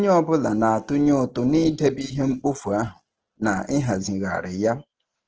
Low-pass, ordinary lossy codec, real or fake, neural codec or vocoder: 7.2 kHz; Opus, 16 kbps; real; none